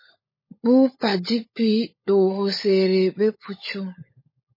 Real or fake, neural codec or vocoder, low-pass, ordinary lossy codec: fake; codec, 16 kHz, 16 kbps, FunCodec, trained on LibriTTS, 50 frames a second; 5.4 kHz; MP3, 24 kbps